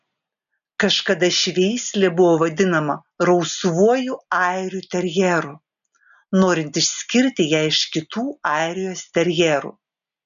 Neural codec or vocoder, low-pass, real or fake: none; 7.2 kHz; real